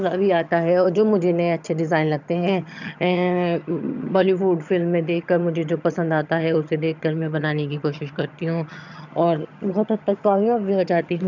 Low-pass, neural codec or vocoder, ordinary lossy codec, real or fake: 7.2 kHz; vocoder, 22.05 kHz, 80 mel bands, HiFi-GAN; none; fake